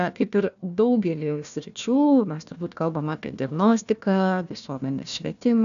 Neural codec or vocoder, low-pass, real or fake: codec, 16 kHz, 1 kbps, FunCodec, trained on Chinese and English, 50 frames a second; 7.2 kHz; fake